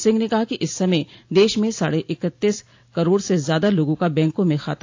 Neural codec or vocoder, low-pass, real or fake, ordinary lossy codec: none; 7.2 kHz; real; AAC, 48 kbps